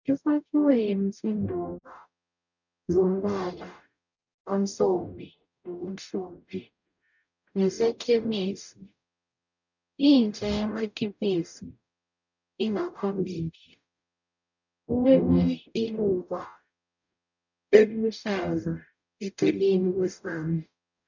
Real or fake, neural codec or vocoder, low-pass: fake; codec, 44.1 kHz, 0.9 kbps, DAC; 7.2 kHz